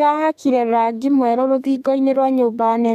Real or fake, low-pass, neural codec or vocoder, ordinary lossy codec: fake; 14.4 kHz; codec, 32 kHz, 1.9 kbps, SNAC; none